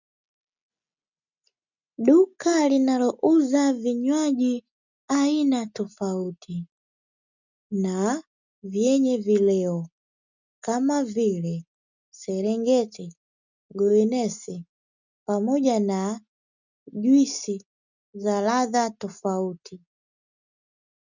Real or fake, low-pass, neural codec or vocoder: real; 7.2 kHz; none